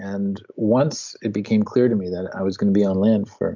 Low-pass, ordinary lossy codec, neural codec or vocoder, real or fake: 7.2 kHz; MP3, 64 kbps; none; real